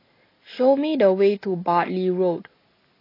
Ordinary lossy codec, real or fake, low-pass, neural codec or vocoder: AAC, 24 kbps; real; 5.4 kHz; none